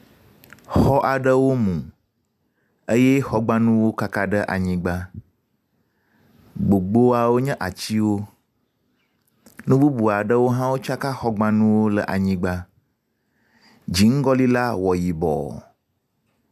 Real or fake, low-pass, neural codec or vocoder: real; 14.4 kHz; none